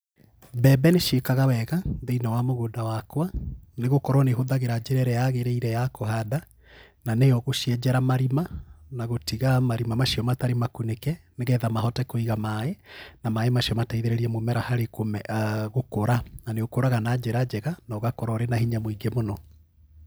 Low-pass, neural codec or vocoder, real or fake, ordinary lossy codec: none; none; real; none